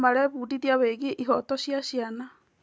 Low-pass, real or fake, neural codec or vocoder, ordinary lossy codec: none; real; none; none